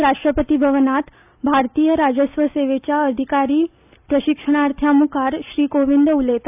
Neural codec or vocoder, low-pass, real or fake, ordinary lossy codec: none; 3.6 kHz; real; AAC, 32 kbps